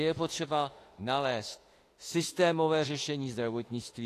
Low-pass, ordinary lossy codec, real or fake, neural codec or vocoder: 14.4 kHz; AAC, 48 kbps; fake; autoencoder, 48 kHz, 32 numbers a frame, DAC-VAE, trained on Japanese speech